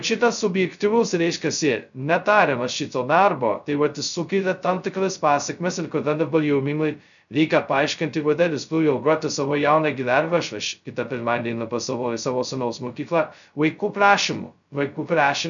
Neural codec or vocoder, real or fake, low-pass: codec, 16 kHz, 0.2 kbps, FocalCodec; fake; 7.2 kHz